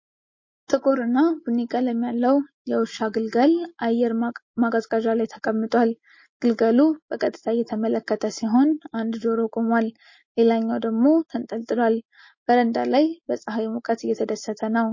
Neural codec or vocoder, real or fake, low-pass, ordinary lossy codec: none; real; 7.2 kHz; MP3, 32 kbps